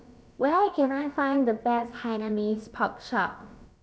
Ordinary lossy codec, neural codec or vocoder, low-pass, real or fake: none; codec, 16 kHz, about 1 kbps, DyCAST, with the encoder's durations; none; fake